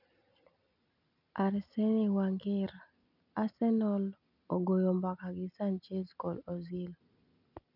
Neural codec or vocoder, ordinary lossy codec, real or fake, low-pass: none; none; real; 5.4 kHz